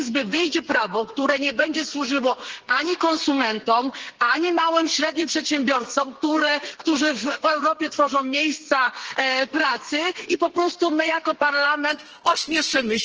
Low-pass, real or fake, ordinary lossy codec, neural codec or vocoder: 7.2 kHz; fake; Opus, 16 kbps; codec, 32 kHz, 1.9 kbps, SNAC